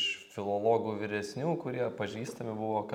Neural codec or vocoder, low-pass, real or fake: none; 19.8 kHz; real